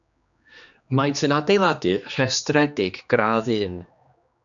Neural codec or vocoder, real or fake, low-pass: codec, 16 kHz, 2 kbps, X-Codec, HuBERT features, trained on general audio; fake; 7.2 kHz